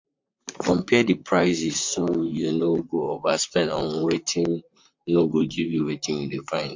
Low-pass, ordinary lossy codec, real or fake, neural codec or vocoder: 7.2 kHz; MP3, 48 kbps; fake; vocoder, 44.1 kHz, 128 mel bands, Pupu-Vocoder